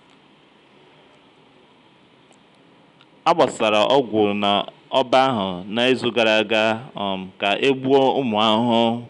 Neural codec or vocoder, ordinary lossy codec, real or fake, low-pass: none; none; real; 10.8 kHz